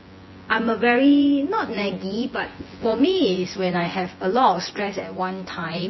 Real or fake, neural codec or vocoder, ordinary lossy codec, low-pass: fake; vocoder, 24 kHz, 100 mel bands, Vocos; MP3, 24 kbps; 7.2 kHz